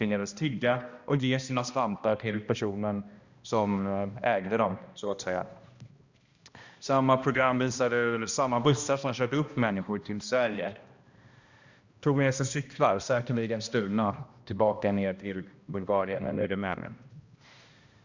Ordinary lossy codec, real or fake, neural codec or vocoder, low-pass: Opus, 64 kbps; fake; codec, 16 kHz, 1 kbps, X-Codec, HuBERT features, trained on balanced general audio; 7.2 kHz